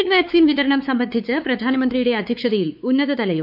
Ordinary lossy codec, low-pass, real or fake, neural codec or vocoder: AAC, 48 kbps; 5.4 kHz; fake; codec, 16 kHz, 4 kbps, X-Codec, WavLM features, trained on Multilingual LibriSpeech